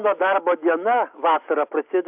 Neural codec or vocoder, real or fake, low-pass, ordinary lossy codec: none; real; 3.6 kHz; AAC, 32 kbps